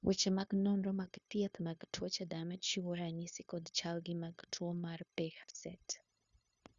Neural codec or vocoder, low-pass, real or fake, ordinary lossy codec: codec, 16 kHz, 0.9 kbps, LongCat-Audio-Codec; 7.2 kHz; fake; Opus, 64 kbps